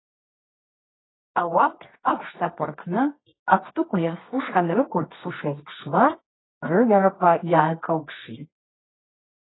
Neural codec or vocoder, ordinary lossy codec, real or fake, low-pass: codec, 24 kHz, 0.9 kbps, WavTokenizer, medium music audio release; AAC, 16 kbps; fake; 7.2 kHz